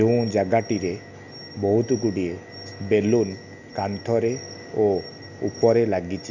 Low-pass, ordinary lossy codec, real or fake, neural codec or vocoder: 7.2 kHz; none; real; none